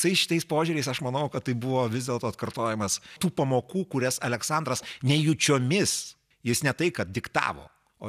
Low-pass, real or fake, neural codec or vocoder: 14.4 kHz; real; none